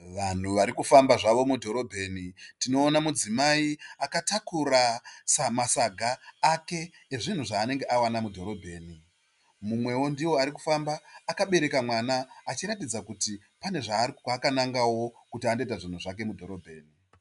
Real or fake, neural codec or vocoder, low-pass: real; none; 10.8 kHz